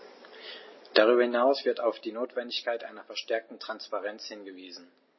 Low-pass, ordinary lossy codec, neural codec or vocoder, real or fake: 7.2 kHz; MP3, 24 kbps; none; real